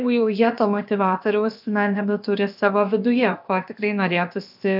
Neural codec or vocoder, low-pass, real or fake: codec, 16 kHz, about 1 kbps, DyCAST, with the encoder's durations; 5.4 kHz; fake